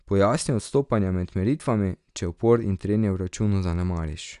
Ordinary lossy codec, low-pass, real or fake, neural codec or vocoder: none; 10.8 kHz; real; none